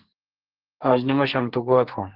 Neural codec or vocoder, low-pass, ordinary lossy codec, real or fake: codec, 44.1 kHz, 2.6 kbps, SNAC; 5.4 kHz; Opus, 16 kbps; fake